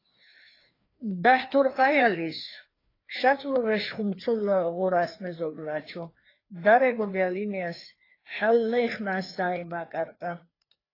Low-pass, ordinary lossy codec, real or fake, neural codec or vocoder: 5.4 kHz; AAC, 24 kbps; fake; codec, 16 kHz, 2 kbps, FreqCodec, larger model